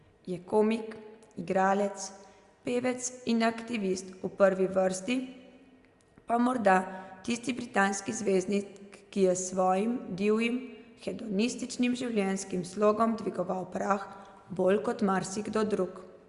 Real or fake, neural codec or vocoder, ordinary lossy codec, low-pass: real; none; Opus, 64 kbps; 10.8 kHz